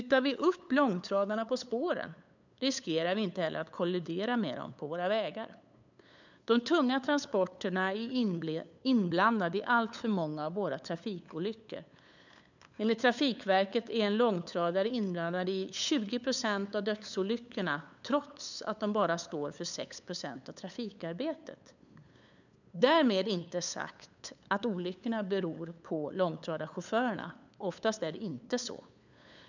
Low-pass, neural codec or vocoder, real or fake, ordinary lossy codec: 7.2 kHz; codec, 16 kHz, 8 kbps, FunCodec, trained on LibriTTS, 25 frames a second; fake; none